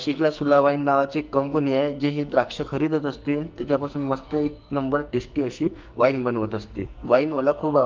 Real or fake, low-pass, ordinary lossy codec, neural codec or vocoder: fake; 7.2 kHz; Opus, 24 kbps; codec, 44.1 kHz, 2.6 kbps, SNAC